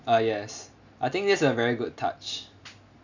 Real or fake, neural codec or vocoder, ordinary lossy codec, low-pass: real; none; none; 7.2 kHz